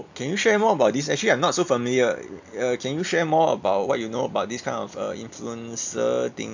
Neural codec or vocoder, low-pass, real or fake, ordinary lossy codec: none; 7.2 kHz; real; none